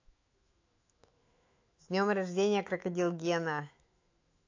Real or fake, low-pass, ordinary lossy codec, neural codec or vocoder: fake; 7.2 kHz; AAC, 48 kbps; autoencoder, 48 kHz, 128 numbers a frame, DAC-VAE, trained on Japanese speech